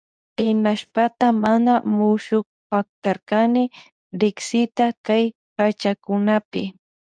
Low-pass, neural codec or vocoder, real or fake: 9.9 kHz; codec, 24 kHz, 0.9 kbps, WavTokenizer, medium speech release version 2; fake